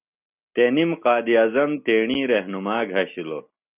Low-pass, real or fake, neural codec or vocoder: 3.6 kHz; real; none